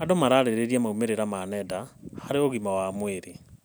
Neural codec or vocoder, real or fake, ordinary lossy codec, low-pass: none; real; none; none